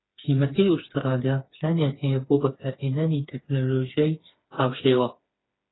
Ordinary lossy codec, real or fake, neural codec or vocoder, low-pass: AAC, 16 kbps; fake; codec, 16 kHz, 4 kbps, FreqCodec, smaller model; 7.2 kHz